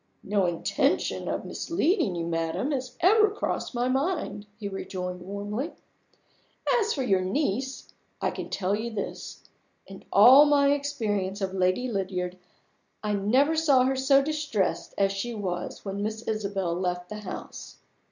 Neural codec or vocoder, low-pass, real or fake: none; 7.2 kHz; real